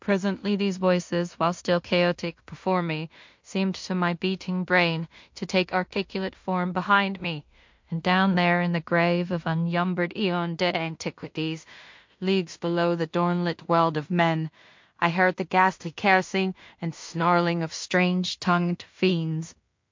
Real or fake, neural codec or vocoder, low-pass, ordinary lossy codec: fake; codec, 16 kHz in and 24 kHz out, 0.4 kbps, LongCat-Audio-Codec, two codebook decoder; 7.2 kHz; MP3, 48 kbps